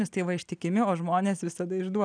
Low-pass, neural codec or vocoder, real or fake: 9.9 kHz; none; real